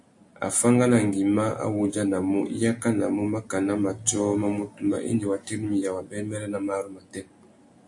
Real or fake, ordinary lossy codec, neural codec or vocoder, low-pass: real; AAC, 64 kbps; none; 10.8 kHz